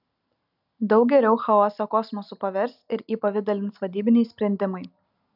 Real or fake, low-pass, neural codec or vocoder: real; 5.4 kHz; none